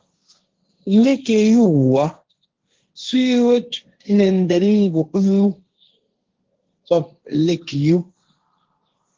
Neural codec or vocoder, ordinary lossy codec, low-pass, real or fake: codec, 16 kHz, 1.1 kbps, Voila-Tokenizer; Opus, 16 kbps; 7.2 kHz; fake